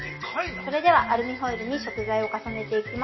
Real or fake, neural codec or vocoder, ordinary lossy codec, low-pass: real; none; MP3, 24 kbps; 7.2 kHz